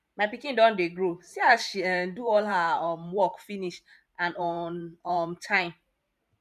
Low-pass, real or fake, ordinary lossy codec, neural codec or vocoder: 14.4 kHz; fake; none; vocoder, 44.1 kHz, 128 mel bands every 512 samples, BigVGAN v2